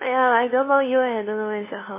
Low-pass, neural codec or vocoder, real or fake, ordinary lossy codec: 3.6 kHz; codec, 16 kHz, 2 kbps, FunCodec, trained on LibriTTS, 25 frames a second; fake; MP3, 16 kbps